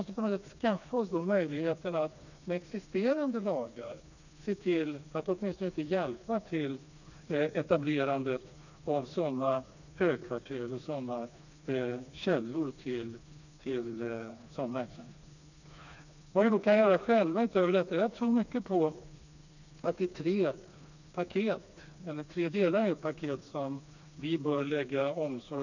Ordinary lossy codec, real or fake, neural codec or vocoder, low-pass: none; fake; codec, 16 kHz, 2 kbps, FreqCodec, smaller model; 7.2 kHz